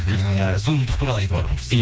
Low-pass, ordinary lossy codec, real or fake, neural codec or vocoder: none; none; fake; codec, 16 kHz, 2 kbps, FreqCodec, smaller model